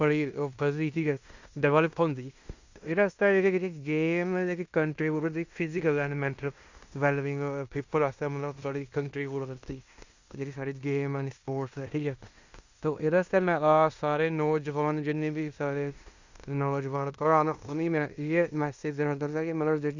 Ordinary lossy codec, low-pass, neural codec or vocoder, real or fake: Opus, 64 kbps; 7.2 kHz; codec, 16 kHz in and 24 kHz out, 0.9 kbps, LongCat-Audio-Codec, fine tuned four codebook decoder; fake